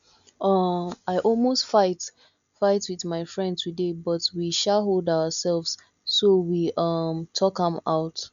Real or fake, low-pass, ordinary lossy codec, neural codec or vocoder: real; 7.2 kHz; none; none